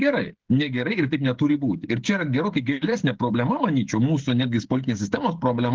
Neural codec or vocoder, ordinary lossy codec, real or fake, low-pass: codec, 16 kHz, 16 kbps, FreqCodec, smaller model; Opus, 16 kbps; fake; 7.2 kHz